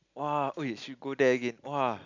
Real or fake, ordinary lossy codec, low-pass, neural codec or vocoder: real; none; 7.2 kHz; none